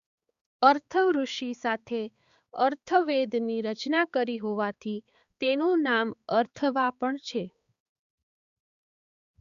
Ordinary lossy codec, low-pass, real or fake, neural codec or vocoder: Opus, 64 kbps; 7.2 kHz; fake; codec, 16 kHz, 4 kbps, X-Codec, HuBERT features, trained on balanced general audio